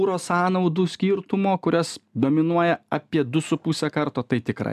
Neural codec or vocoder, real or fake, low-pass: none; real; 14.4 kHz